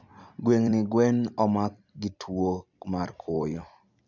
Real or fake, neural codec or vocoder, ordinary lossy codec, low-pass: fake; vocoder, 44.1 kHz, 128 mel bands every 256 samples, BigVGAN v2; none; 7.2 kHz